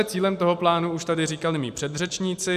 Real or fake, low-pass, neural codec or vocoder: fake; 14.4 kHz; vocoder, 44.1 kHz, 128 mel bands every 512 samples, BigVGAN v2